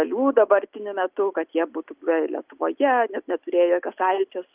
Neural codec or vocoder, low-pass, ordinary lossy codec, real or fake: none; 3.6 kHz; Opus, 24 kbps; real